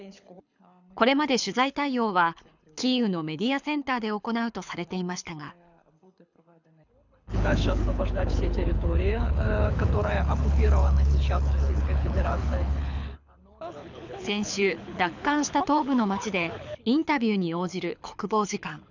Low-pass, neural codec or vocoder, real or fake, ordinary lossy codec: 7.2 kHz; codec, 24 kHz, 6 kbps, HILCodec; fake; none